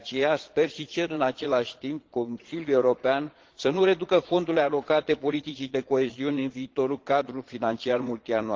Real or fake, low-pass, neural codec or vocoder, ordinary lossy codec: fake; 7.2 kHz; vocoder, 22.05 kHz, 80 mel bands, Vocos; Opus, 16 kbps